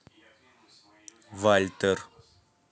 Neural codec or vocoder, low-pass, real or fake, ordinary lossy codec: none; none; real; none